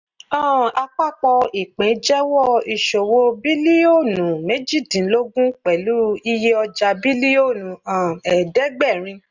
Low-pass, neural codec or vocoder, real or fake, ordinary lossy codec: 7.2 kHz; none; real; none